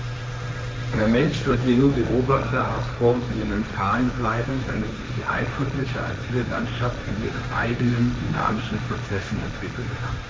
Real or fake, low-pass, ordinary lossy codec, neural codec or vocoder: fake; none; none; codec, 16 kHz, 1.1 kbps, Voila-Tokenizer